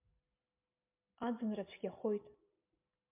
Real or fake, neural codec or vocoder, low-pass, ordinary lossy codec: real; none; 3.6 kHz; MP3, 32 kbps